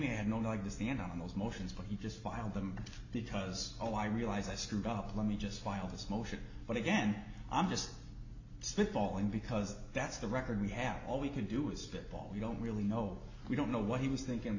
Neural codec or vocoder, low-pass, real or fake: none; 7.2 kHz; real